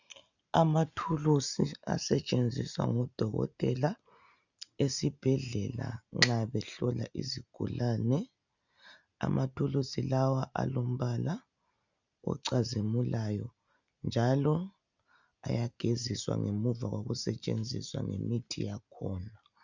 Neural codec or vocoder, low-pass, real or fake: none; 7.2 kHz; real